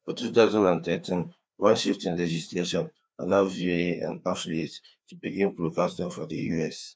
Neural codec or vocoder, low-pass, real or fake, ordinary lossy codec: codec, 16 kHz, 2 kbps, FreqCodec, larger model; none; fake; none